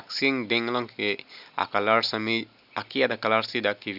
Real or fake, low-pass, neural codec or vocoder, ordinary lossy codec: real; 5.4 kHz; none; none